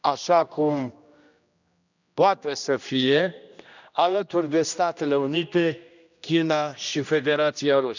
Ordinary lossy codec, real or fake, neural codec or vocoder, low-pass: none; fake; codec, 16 kHz, 1 kbps, X-Codec, HuBERT features, trained on general audio; 7.2 kHz